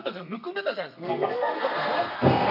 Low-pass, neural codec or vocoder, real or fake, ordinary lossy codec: 5.4 kHz; codec, 32 kHz, 1.9 kbps, SNAC; fake; none